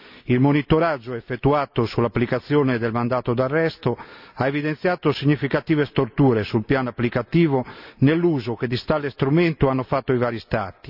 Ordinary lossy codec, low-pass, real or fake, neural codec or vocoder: none; 5.4 kHz; real; none